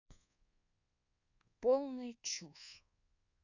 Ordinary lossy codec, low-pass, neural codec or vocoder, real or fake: none; 7.2 kHz; codec, 24 kHz, 1.2 kbps, DualCodec; fake